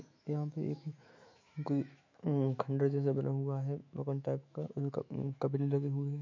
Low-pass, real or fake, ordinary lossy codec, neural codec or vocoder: 7.2 kHz; fake; MP3, 48 kbps; autoencoder, 48 kHz, 128 numbers a frame, DAC-VAE, trained on Japanese speech